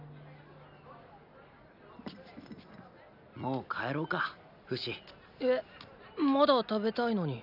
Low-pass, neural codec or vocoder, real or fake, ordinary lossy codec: 5.4 kHz; none; real; none